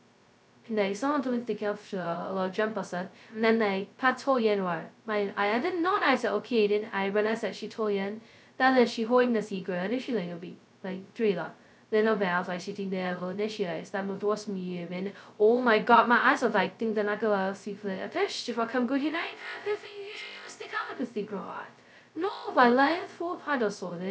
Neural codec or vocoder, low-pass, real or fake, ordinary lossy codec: codec, 16 kHz, 0.2 kbps, FocalCodec; none; fake; none